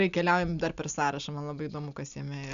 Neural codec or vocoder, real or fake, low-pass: none; real; 7.2 kHz